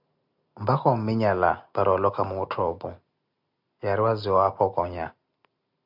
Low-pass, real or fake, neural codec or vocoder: 5.4 kHz; real; none